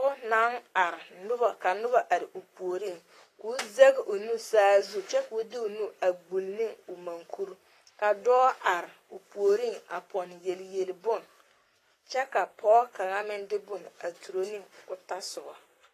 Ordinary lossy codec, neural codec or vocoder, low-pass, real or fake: AAC, 48 kbps; codec, 44.1 kHz, 7.8 kbps, Pupu-Codec; 14.4 kHz; fake